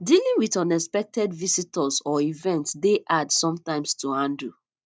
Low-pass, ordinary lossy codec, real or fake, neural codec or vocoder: none; none; real; none